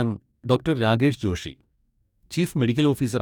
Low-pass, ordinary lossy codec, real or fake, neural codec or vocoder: 19.8 kHz; none; fake; codec, 44.1 kHz, 2.6 kbps, DAC